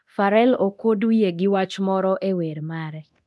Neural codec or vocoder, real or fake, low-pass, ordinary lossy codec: codec, 24 kHz, 0.9 kbps, DualCodec; fake; none; none